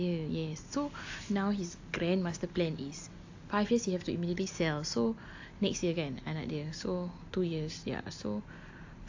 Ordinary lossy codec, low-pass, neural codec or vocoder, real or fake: AAC, 48 kbps; 7.2 kHz; none; real